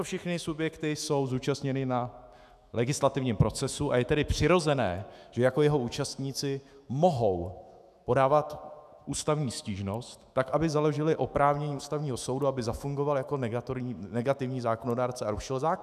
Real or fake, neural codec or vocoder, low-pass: fake; autoencoder, 48 kHz, 128 numbers a frame, DAC-VAE, trained on Japanese speech; 14.4 kHz